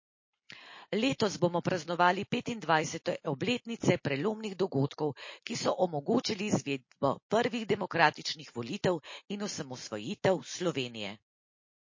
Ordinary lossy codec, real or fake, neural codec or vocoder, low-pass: MP3, 32 kbps; real; none; 7.2 kHz